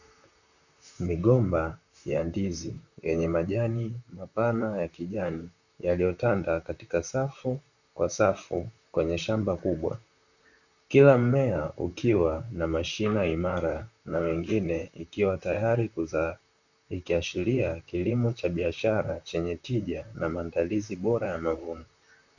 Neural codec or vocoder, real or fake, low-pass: vocoder, 44.1 kHz, 128 mel bands, Pupu-Vocoder; fake; 7.2 kHz